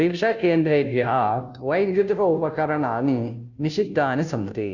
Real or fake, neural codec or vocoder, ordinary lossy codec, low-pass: fake; codec, 16 kHz, 0.5 kbps, FunCodec, trained on Chinese and English, 25 frames a second; none; 7.2 kHz